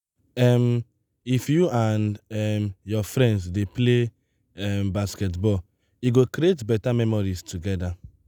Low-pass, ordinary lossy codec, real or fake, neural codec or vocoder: 19.8 kHz; none; real; none